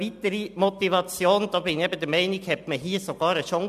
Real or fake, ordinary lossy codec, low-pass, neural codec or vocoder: real; MP3, 96 kbps; 14.4 kHz; none